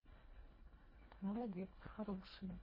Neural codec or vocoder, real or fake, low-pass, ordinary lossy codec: codec, 24 kHz, 1.5 kbps, HILCodec; fake; 5.4 kHz; MP3, 24 kbps